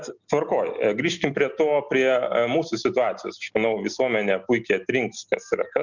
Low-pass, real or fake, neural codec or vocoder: 7.2 kHz; real; none